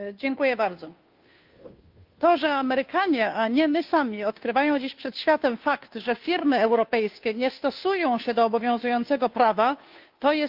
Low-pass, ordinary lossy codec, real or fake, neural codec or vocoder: 5.4 kHz; Opus, 24 kbps; fake; codec, 16 kHz, 2 kbps, FunCodec, trained on Chinese and English, 25 frames a second